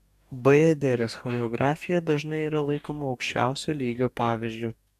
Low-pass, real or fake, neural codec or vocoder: 14.4 kHz; fake; codec, 44.1 kHz, 2.6 kbps, DAC